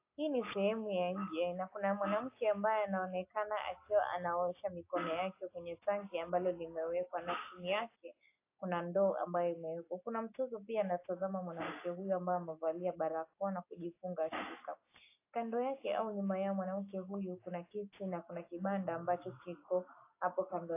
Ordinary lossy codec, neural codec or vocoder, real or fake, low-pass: AAC, 24 kbps; none; real; 3.6 kHz